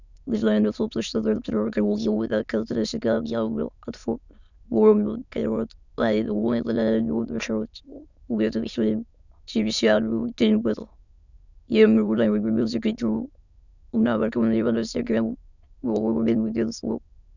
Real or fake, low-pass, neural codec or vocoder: fake; 7.2 kHz; autoencoder, 22.05 kHz, a latent of 192 numbers a frame, VITS, trained on many speakers